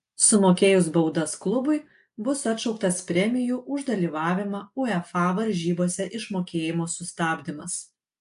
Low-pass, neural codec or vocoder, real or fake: 10.8 kHz; none; real